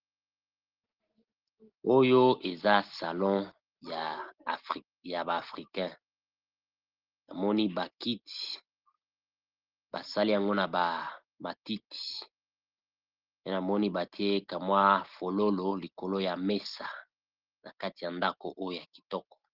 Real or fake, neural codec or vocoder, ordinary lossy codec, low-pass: real; none; Opus, 16 kbps; 5.4 kHz